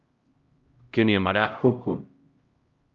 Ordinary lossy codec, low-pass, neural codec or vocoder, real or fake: Opus, 32 kbps; 7.2 kHz; codec, 16 kHz, 0.5 kbps, X-Codec, HuBERT features, trained on LibriSpeech; fake